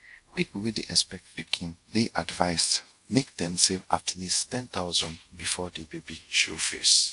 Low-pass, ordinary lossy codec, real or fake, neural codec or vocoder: 10.8 kHz; AAC, 64 kbps; fake; codec, 24 kHz, 0.5 kbps, DualCodec